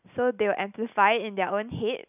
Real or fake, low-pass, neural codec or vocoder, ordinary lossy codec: real; 3.6 kHz; none; none